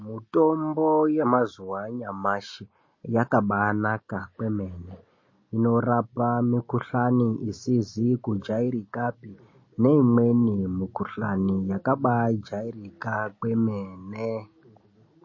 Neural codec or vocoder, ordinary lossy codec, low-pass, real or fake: none; MP3, 32 kbps; 7.2 kHz; real